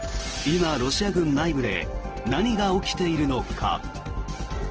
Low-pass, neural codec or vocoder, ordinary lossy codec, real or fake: 7.2 kHz; none; Opus, 16 kbps; real